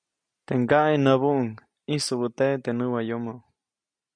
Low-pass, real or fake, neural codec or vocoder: 9.9 kHz; real; none